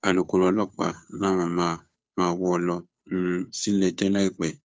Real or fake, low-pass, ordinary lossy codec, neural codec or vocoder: fake; none; none; codec, 16 kHz, 2 kbps, FunCodec, trained on Chinese and English, 25 frames a second